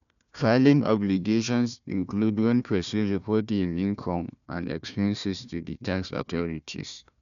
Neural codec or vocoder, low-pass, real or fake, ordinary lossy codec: codec, 16 kHz, 1 kbps, FunCodec, trained on Chinese and English, 50 frames a second; 7.2 kHz; fake; none